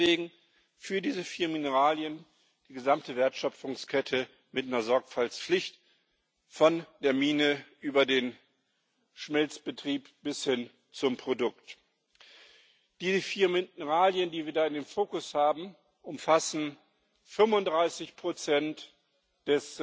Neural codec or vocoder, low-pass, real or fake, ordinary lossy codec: none; none; real; none